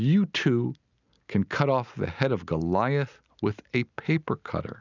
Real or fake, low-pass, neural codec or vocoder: real; 7.2 kHz; none